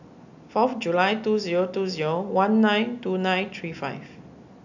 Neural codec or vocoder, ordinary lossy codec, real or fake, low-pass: none; none; real; 7.2 kHz